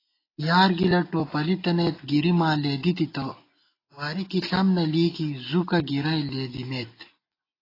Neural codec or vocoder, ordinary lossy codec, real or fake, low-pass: none; AAC, 24 kbps; real; 5.4 kHz